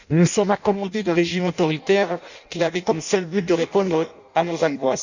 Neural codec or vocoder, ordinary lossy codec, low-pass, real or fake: codec, 16 kHz in and 24 kHz out, 0.6 kbps, FireRedTTS-2 codec; none; 7.2 kHz; fake